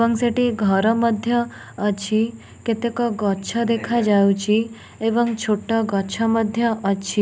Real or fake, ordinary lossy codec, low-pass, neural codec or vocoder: real; none; none; none